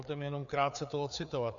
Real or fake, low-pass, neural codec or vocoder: fake; 7.2 kHz; codec, 16 kHz, 4 kbps, FreqCodec, larger model